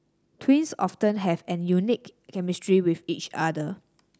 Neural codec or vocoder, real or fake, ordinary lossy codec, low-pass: none; real; none; none